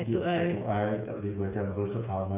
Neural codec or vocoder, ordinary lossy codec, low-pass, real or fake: codec, 16 kHz, 4 kbps, FreqCodec, smaller model; none; 3.6 kHz; fake